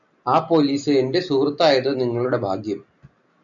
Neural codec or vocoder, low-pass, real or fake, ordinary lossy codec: none; 7.2 kHz; real; MP3, 96 kbps